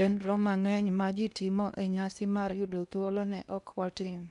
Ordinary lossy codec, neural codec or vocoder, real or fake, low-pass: none; codec, 16 kHz in and 24 kHz out, 0.8 kbps, FocalCodec, streaming, 65536 codes; fake; 10.8 kHz